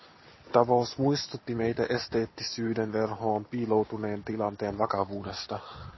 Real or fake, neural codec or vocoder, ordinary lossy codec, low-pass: real; none; MP3, 24 kbps; 7.2 kHz